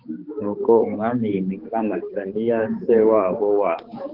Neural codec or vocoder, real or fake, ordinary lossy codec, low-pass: codec, 16 kHz, 4 kbps, X-Codec, HuBERT features, trained on balanced general audio; fake; Opus, 16 kbps; 5.4 kHz